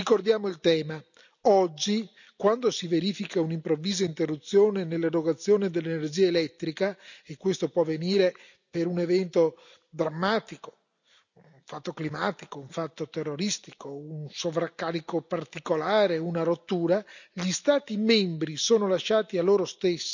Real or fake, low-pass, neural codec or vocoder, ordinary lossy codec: real; 7.2 kHz; none; none